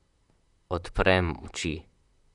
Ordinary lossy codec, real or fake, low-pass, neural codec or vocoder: none; fake; 10.8 kHz; vocoder, 44.1 kHz, 128 mel bands, Pupu-Vocoder